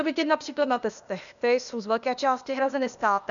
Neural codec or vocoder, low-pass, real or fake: codec, 16 kHz, 0.8 kbps, ZipCodec; 7.2 kHz; fake